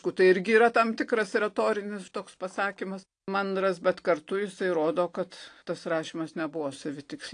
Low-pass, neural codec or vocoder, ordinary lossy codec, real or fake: 9.9 kHz; none; AAC, 48 kbps; real